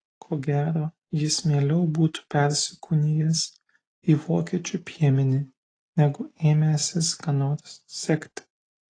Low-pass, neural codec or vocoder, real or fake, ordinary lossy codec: 9.9 kHz; none; real; AAC, 32 kbps